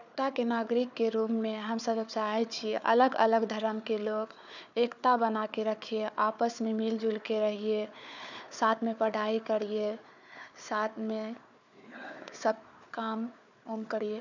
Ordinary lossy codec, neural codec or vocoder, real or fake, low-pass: none; codec, 16 kHz, 4 kbps, FunCodec, trained on Chinese and English, 50 frames a second; fake; 7.2 kHz